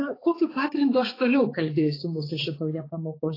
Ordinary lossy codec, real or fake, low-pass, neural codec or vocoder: AAC, 24 kbps; fake; 5.4 kHz; codec, 16 kHz, 4 kbps, X-Codec, HuBERT features, trained on balanced general audio